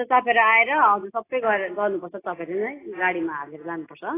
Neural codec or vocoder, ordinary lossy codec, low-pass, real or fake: none; AAC, 16 kbps; 3.6 kHz; real